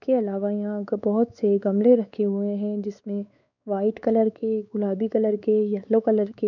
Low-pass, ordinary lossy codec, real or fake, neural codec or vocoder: 7.2 kHz; none; fake; codec, 16 kHz, 4 kbps, X-Codec, WavLM features, trained on Multilingual LibriSpeech